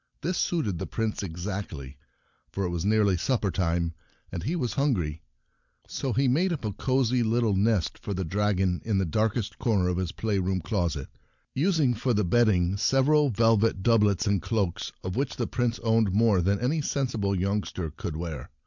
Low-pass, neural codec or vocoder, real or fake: 7.2 kHz; none; real